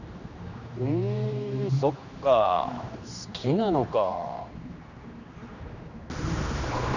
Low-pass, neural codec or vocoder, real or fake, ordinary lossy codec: 7.2 kHz; codec, 16 kHz, 2 kbps, X-Codec, HuBERT features, trained on general audio; fake; none